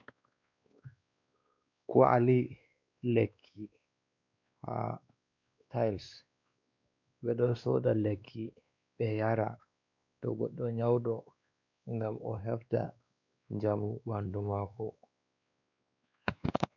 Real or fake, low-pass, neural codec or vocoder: fake; 7.2 kHz; codec, 16 kHz, 2 kbps, X-Codec, WavLM features, trained on Multilingual LibriSpeech